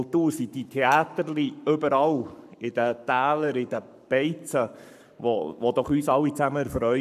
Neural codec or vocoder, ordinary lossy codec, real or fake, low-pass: codec, 44.1 kHz, 7.8 kbps, Pupu-Codec; none; fake; 14.4 kHz